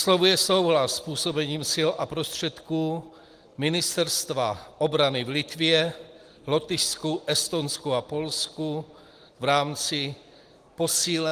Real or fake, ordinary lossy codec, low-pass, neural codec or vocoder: real; Opus, 24 kbps; 14.4 kHz; none